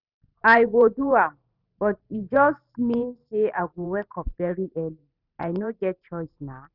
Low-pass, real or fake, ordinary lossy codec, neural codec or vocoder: 5.4 kHz; fake; none; vocoder, 44.1 kHz, 128 mel bands every 512 samples, BigVGAN v2